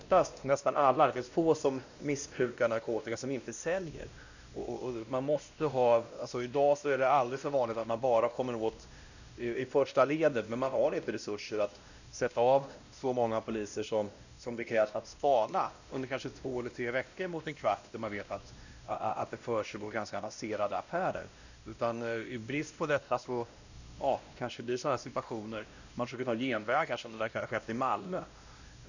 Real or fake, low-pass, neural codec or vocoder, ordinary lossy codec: fake; 7.2 kHz; codec, 16 kHz, 1 kbps, X-Codec, WavLM features, trained on Multilingual LibriSpeech; none